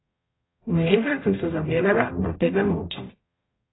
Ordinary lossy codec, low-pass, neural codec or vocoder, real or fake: AAC, 16 kbps; 7.2 kHz; codec, 44.1 kHz, 0.9 kbps, DAC; fake